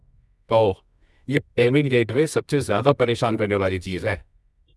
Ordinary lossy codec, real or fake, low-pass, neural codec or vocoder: none; fake; none; codec, 24 kHz, 0.9 kbps, WavTokenizer, medium music audio release